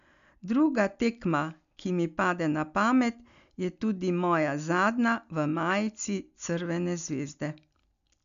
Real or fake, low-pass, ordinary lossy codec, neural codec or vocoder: real; 7.2 kHz; none; none